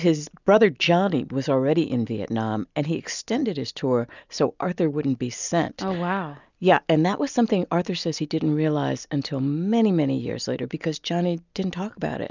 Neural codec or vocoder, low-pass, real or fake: none; 7.2 kHz; real